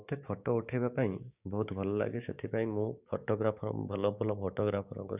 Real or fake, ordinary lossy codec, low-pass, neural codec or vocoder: fake; none; 3.6 kHz; codec, 16 kHz, 6 kbps, DAC